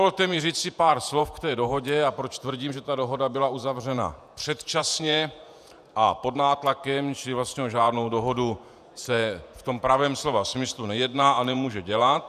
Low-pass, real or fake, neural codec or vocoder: 14.4 kHz; fake; vocoder, 48 kHz, 128 mel bands, Vocos